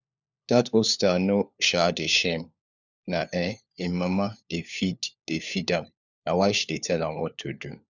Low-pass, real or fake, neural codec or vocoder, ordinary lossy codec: 7.2 kHz; fake; codec, 16 kHz, 4 kbps, FunCodec, trained on LibriTTS, 50 frames a second; none